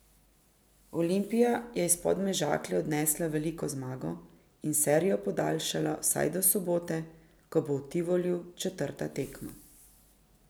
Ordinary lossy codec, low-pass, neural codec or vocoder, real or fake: none; none; none; real